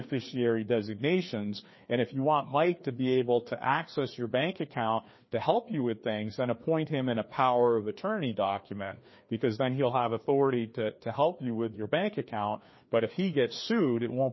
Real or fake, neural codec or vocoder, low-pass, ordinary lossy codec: fake; codec, 16 kHz, 2 kbps, FreqCodec, larger model; 7.2 kHz; MP3, 24 kbps